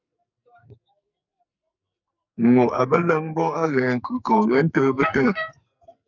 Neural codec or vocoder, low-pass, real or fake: codec, 44.1 kHz, 2.6 kbps, SNAC; 7.2 kHz; fake